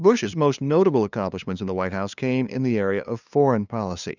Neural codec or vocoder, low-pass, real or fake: codec, 16 kHz, 2 kbps, FunCodec, trained on LibriTTS, 25 frames a second; 7.2 kHz; fake